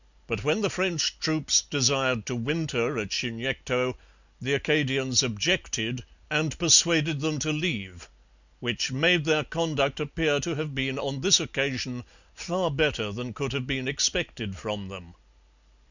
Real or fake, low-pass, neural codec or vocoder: real; 7.2 kHz; none